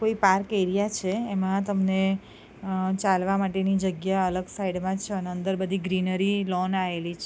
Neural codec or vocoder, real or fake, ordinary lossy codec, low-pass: none; real; none; none